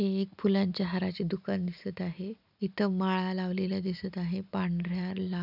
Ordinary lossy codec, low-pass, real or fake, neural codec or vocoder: none; 5.4 kHz; real; none